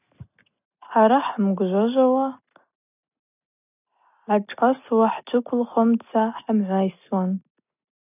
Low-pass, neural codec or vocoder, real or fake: 3.6 kHz; none; real